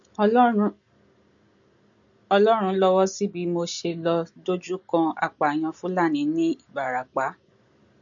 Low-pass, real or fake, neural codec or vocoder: 7.2 kHz; real; none